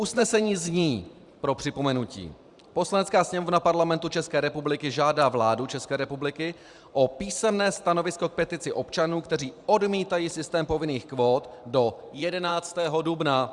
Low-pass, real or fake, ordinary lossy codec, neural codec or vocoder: 10.8 kHz; fake; Opus, 64 kbps; vocoder, 44.1 kHz, 128 mel bands every 512 samples, BigVGAN v2